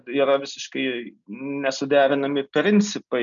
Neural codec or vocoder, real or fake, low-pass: none; real; 7.2 kHz